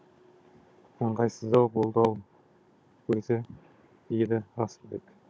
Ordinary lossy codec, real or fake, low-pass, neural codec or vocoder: none; fake; none; codec, 16 kHz, 16 kbps, FunCodec, trained on Chinese and English, 50 frames a second